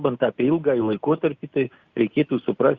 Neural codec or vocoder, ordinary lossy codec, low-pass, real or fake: none; Opus, 64 kbps; 7.2 kHz; real